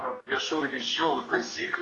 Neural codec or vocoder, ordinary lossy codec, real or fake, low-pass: codec, 44.1 kHz, 2.6 kbps, DAC; AAC, 32 kbps; fake; 10.8 kHz